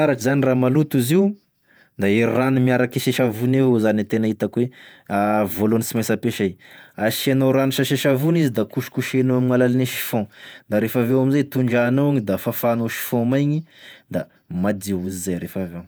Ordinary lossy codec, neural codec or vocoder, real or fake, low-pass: none; vocoder, 48 kHz, 128 mel bands, Vocos; fake; none